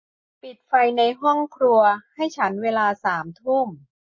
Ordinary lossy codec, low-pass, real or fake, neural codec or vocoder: MP3, 32 kbps; 7.2 kHz; real; none